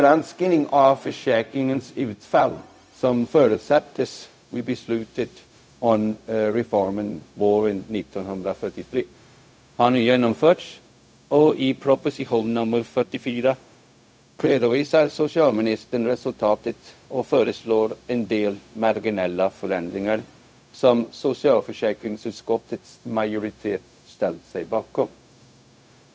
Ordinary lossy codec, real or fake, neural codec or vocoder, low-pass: none; fake; codec, 16 kHz, 0.4 kbps, LongCat-Audio-Codec; none